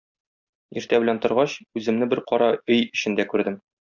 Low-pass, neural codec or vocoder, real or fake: 7.2 kHz; none; real